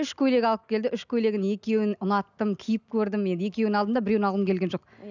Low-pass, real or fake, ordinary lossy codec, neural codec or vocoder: 7.2 kHz; real; none; none